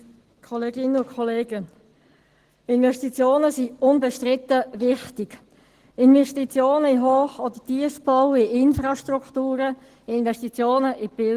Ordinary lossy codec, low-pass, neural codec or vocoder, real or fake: Opus, 16 kbps; 14.4 kHz; none; real